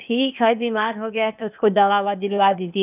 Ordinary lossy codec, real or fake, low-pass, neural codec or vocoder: none; fake; 3.6 kHz; codec, 16 kHz, 0.8 kbps, ZipCodec